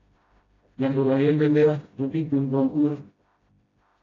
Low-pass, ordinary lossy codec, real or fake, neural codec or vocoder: 7.2 kHz; AAC, 32 kbps; fake; codec, 16 kHz, 0.5 kbps, FreqCodec, smaller model